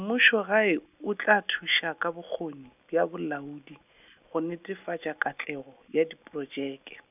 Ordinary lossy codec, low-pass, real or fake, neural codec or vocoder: none; 3.6 kHz; real; none